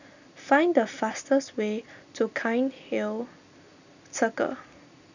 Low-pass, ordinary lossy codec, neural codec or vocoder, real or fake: 7.2 kHz; none; none; real